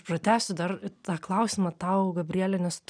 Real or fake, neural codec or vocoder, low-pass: real; none; 9.9 kHz